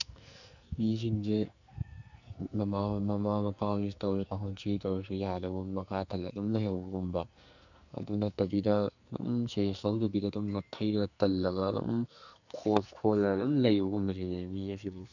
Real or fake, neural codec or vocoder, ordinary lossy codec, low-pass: fake; codec, 44.1 kHz, 2.6 kbps, SNAC; none; 7.2 kHz